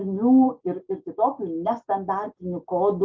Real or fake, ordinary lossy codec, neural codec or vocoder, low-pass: real; Opus, 32 kbps; none; 7.2 kHz